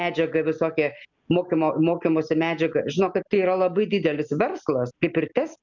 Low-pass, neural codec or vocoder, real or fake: 7.2 kHz; none; real